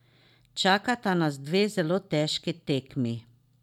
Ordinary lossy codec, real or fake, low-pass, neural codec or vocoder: none; real; 19.8 kHz; none